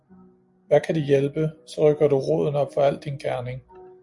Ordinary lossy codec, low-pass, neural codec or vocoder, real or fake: MP3, 64 kbps; 10.8 kHz; none; real